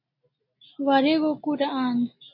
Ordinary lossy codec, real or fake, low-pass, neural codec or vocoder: MP3, 32 kbps; real; 5.4 kHz; none